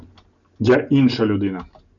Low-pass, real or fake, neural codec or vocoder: 7.2 kHz; real; none